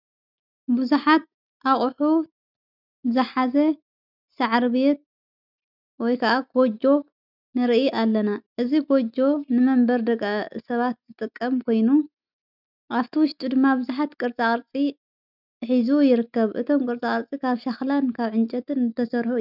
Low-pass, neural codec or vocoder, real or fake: 5.4 kHz; none; real